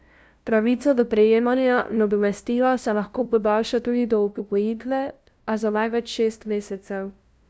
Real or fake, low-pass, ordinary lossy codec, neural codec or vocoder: fake; none; none; codec, 16 kHz, 0.5 kbps, FunCodec, trained on LibriTTS, 25 frames a second